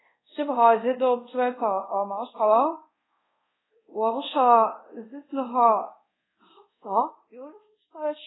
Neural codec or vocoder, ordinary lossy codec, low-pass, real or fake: codec, 24 kHz, 0.5 kbps, DualCodec; AAC, 16 kbps; 7.2 kHz; fake